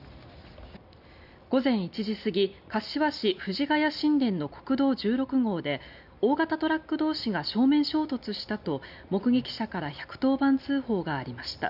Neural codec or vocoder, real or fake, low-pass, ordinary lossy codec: none; real; 5.4 kHz; none